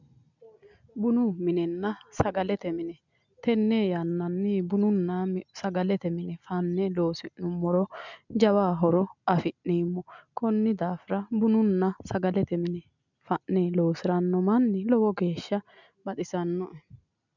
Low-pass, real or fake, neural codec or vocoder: 7.2 kHz; real; none